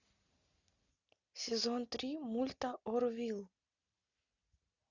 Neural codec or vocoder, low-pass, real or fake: none; 7.2 kHz; real